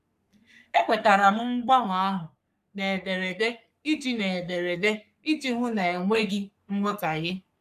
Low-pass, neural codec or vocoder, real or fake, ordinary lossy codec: 14.4 kHz; codec, 44.1 kHz, 3.4 kbps, Pupu-Codec; fake; none